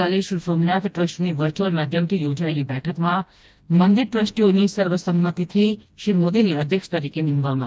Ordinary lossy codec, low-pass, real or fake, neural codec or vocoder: none; none; fake; codec, 16 kHz, 1 kbps, FreqCodec, smaller model